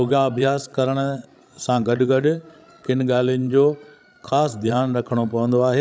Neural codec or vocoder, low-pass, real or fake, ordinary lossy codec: codec, 16 kHz, 16 kbps, FreqCodec, larger model; none; fake; none